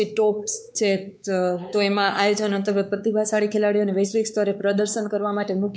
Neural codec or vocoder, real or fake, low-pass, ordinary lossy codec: codec, 16 kHz, 4 kbps, X-Codec, WavLM features, trained on Multilingual LibriSpeech; fake; none; none